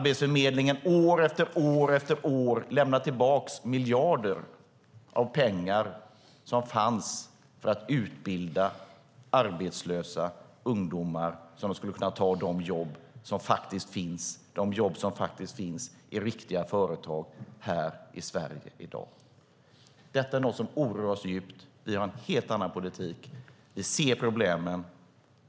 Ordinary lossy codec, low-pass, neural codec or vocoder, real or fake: none; none; none; real